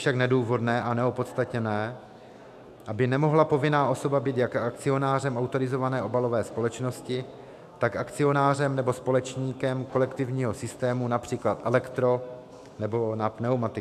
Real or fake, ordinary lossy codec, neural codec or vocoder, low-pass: fake; AAC, 96 kbps; autoencoder, 48 kHz, 128 numbers a frame, DAC-VAE, trained on Japanese speech; 14.4 kHz